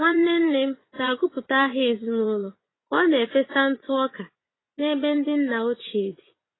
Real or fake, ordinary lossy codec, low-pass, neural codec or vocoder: fake; AAC, 16 kbps; 7.2 kHz; vocoder, 24 kHz, 100 mel bands, Vocos